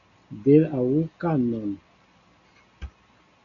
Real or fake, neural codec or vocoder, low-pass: real; none; 7.2 kHz